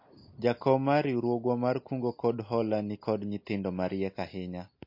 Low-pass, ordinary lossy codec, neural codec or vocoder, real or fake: 5.4 kHz; MP3, 32 kbps; none; real